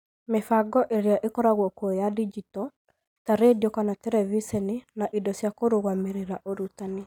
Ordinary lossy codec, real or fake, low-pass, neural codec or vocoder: none; real; 19.8 kHz; none